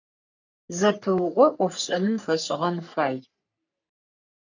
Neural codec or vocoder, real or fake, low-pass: codec, 44.1 kHz, 3.4 kbps, Pupu-Codec; fake; 7.2 kHz